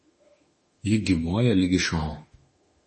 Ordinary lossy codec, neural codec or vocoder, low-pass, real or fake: MP3, 32 kbps; autoencoder, 48 kHz, 32 numbers a frame, DAC-VAE, trained on Japanese speech; 10.8 kHz; fake